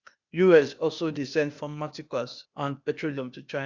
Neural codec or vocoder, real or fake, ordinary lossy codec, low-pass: codec, 16 kHz, 0.8 kbps, ZipCodec; fake; Opus, 64 kbps; 7.2 kHz